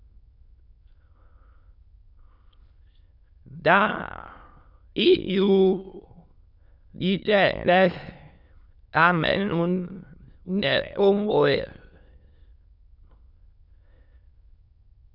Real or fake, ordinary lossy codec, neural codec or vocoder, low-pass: fake; Opus, 64 kbps; autoencoder, 22.05 kHz, a latent of 192 numbers a frame, VITS, trained on many speakers; 5.4 kHz